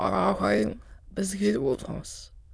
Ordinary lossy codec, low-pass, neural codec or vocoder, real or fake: none; none; autoencoder, 22.05 kHz, a latent of 192 numbers a frame, VITS, trained on many speakers; fake